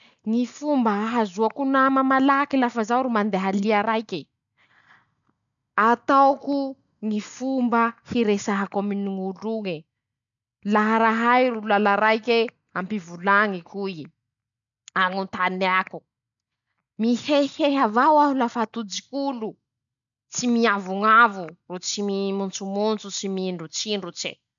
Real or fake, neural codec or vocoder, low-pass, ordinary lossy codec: real; none; 7.2 kHz; none